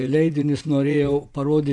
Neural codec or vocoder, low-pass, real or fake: vocoder, 24 kHz, 100 mel bands, Vocos; 10.8 kHz; fake